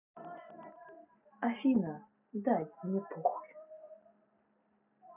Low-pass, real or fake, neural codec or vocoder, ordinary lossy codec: 3.6 kHz; real; none; none